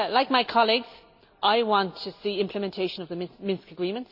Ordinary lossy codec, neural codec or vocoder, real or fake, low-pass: AAC, 48 kbps; none; real; 5.4 kHz